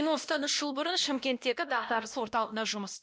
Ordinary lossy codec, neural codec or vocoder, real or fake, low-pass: none; codec, 16 kHz, 1 kbps, X-Codec, WavLM features, trained on Multilingual LibriSpeech; fake; none